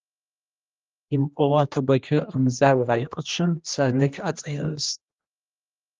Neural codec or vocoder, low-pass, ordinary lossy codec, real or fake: codec, 16 kHz, 1 kbps, X-Codec, HuBERT features, trained on general audio; 7.2 kHz; Opus, 32 kbps; fake